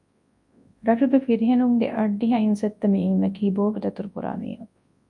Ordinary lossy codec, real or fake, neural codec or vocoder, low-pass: MP3, 64 kbps; fake; codec, 24 kHz, 0.9 kbps, WavTokenizer, large speech release; 10.8 kHz